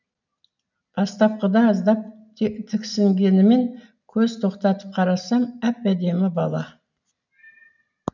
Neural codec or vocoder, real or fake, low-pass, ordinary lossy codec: none; real; 7.2 kHz; none